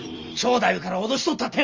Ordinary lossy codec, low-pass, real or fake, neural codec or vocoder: Opus, 32 kbps; 7.2 kHz; real; none